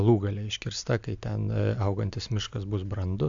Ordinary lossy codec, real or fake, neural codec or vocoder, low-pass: AAC, 64 kbps; real; none; 7.2 kHz